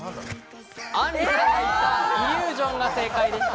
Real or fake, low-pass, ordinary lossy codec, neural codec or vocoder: real; none; none; none